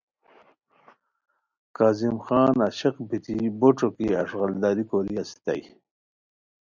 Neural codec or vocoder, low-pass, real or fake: none; 7.2 kHz; real